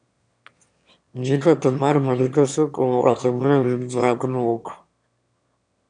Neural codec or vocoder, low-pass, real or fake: autoencoder, 22.05 kHz, a latent of 192 numbers a frame, VITS, trained on one speaker; 9.9 kHz; fake